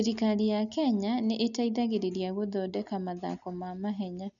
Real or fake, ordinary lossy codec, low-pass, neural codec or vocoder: real; none; 7.2 kHz; none